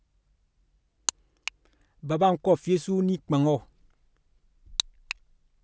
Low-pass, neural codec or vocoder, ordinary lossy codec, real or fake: none; none; none; real